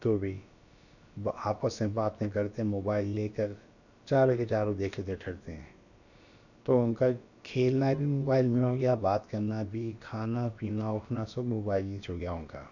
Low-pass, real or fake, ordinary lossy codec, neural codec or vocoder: 7.2 kHz; fake; none; codec, 16 kHz, about 1 kbps, DyCAST, with the encoder's durations